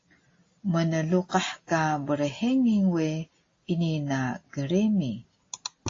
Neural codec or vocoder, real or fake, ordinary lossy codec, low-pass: none; real; AAC, 32 kbps; 7.2 kHz